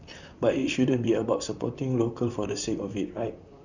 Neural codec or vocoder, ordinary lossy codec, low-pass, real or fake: vocoder, 44.1 kHz, 128 mel bands, Pupu-Vocoder; none; 7.2 kHz; fake